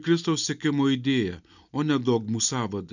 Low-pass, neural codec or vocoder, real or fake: 7.2 kHz; none; real